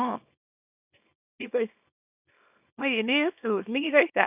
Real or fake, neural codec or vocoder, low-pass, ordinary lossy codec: fake; codec, 24 kHz, 0.9 kbps, WavTokenizer, small release; 3.6 kHz; none